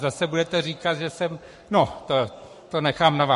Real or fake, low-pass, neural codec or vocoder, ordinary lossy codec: fake; 14.4 kHz; codec, 44.1 kHz, 7.8 kbps, Pupu-Codec; MP3, 48 kbps